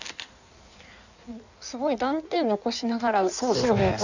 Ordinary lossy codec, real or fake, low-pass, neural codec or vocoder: none; fake; 7.2 kHz; codec, 16 kHz in and 24 kHz out, 1.1 kbps, FireRedTTS-2 codec